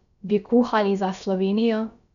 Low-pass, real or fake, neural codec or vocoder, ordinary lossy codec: 7.2 kHz; fake; codec, 16 kHz, about 1 kbps, DyCAST, with the encoder's durations; none